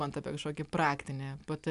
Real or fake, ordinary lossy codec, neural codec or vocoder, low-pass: real; AAC, 96 kbps; none; 10.8 kHz